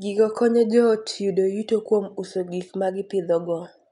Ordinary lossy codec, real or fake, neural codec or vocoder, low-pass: none; real; none; 10.8 kHz